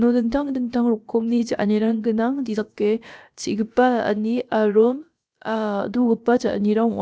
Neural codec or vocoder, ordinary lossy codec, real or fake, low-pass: codec, 16 kHz, about 1 kbps, DyCAST, with the encoder's durations; none; fake; none